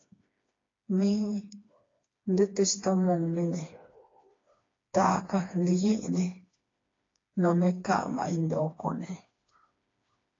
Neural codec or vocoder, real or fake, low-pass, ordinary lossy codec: codec, 16 kHz, 2 kbps, FreqCodec, smaller model; fake; 7.2 kHz; AAC, 32 kbps